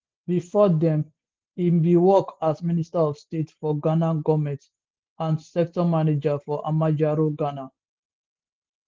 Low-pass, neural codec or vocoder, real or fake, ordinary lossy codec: 7.2 kHz; none; real; Opus, 16 kbps